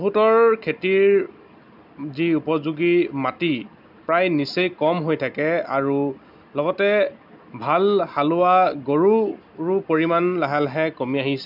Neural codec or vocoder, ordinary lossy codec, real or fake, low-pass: none; none; real; 5.4 kHz